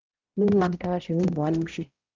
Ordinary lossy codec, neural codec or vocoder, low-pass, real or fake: Opus, 32 kbps; codec, 16 kHz, 0.5 kbps, X-Codec, HuBERT features, trained on balanced general audio; 7.2 kHz; fake